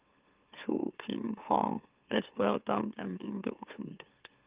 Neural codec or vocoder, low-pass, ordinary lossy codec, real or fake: autoencoder, 44.1 kHz, a latent of 192 numbers a frame, MeloTTS; 3.6 kHz; Opus, 32 kbps; fake